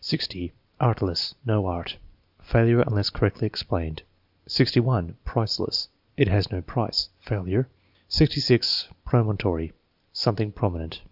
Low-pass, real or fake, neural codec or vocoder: 5.4 kHz; real; none